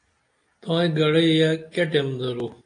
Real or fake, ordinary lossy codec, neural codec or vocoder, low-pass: real; AAC, 48 kbps; none; 9.9 kHz